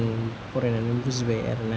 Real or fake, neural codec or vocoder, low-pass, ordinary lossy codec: real; none; none; none